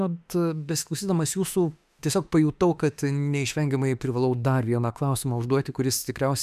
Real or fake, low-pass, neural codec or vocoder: fake; 14.4 kHz; autoencoder, 48 kHz, 32 numbers a frame, DAC-VAE, trained on Japanese speech